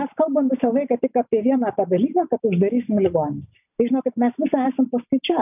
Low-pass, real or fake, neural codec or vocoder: 3.6 kHz; real; none